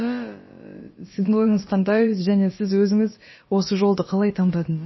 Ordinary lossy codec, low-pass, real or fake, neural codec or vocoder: MP3, 24 kbps; 7.2 kHz; fake; codec, 16 kHz, about 1 kbps, DyCAST, with the encoder's durations